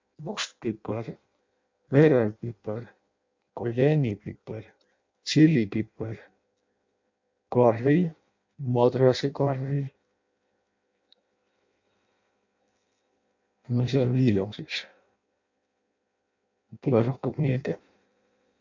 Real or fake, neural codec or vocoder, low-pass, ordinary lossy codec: fake; codec, 16 kHz in and 24 kHz out, 0.6 kbps, FireRedTTS-2 codec; 7.2 kHz; MP3, 48 kbps